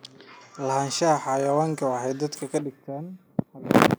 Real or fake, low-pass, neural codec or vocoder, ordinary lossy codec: real; none; none; none